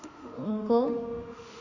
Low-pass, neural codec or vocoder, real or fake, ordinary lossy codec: 7.2 kHz; autoencoder, 48 kHz, 32 numbers a frame, DAC-VAE, trained on Japanese speech; fake; none